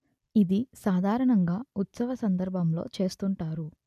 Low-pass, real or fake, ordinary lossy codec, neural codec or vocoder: 14.4 kHz; real; none; none